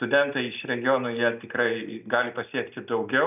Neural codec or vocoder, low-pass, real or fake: none; 3.6 kHz; real